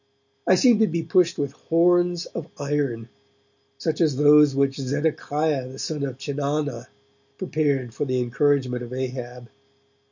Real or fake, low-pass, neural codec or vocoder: real; 7.2 kHz; none